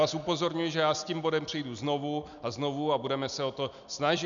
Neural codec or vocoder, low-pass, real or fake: none; 7.2 kHz; real